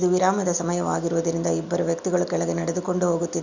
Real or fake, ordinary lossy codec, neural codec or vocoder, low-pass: real; none; none; 7.2 kHz